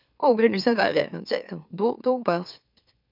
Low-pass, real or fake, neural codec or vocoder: 5.4 kHz; fake; autoencoder, 44.1 kHz, a latent of 192 numbers a frame, MeloTTS